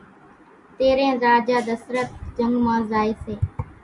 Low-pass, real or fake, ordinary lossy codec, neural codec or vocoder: 10.8 kHz; real; Opus, 64 kbps; none